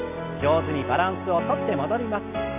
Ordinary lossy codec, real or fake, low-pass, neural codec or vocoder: none; real; 3.6 kHz; none